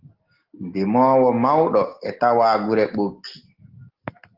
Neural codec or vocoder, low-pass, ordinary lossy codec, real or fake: none; 5.4 kHz; Opus, 16 kbps; real